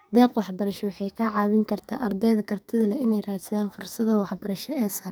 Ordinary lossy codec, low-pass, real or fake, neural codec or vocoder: none; none; fake; codec, 44.1 kHz, 2.6 kbps, SNAC